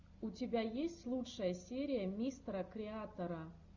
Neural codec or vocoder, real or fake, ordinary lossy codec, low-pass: none; real; Opus, 64 kbps; 7.2 kHz